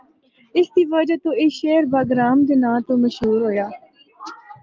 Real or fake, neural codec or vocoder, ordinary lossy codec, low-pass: real; none; Opus, 32 kbps; 7.2 kHz